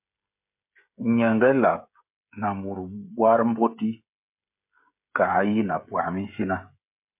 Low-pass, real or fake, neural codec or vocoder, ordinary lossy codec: 3.6 kHz; fake; codec, 16 kHz, 16 kbps, FreqCodec, smaller model; MP3, 32 kbps